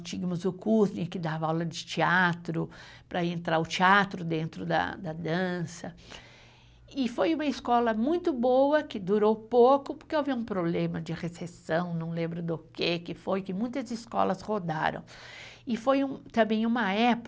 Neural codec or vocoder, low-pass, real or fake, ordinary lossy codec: none; none; real; none